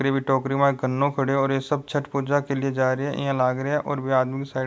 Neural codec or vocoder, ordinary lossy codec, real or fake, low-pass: none; none; real; none